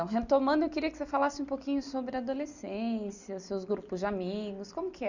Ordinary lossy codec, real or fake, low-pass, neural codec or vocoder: none; real; 7.2 kHz; none